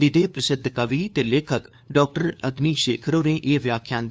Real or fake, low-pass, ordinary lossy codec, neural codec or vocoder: fake; none; none; codec, 16 kHz, 2 kbps, FunCodec, trained on LibriTTS, 25 frames a second